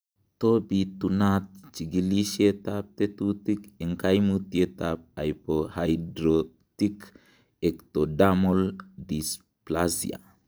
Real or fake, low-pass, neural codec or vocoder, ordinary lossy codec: real; none; none; none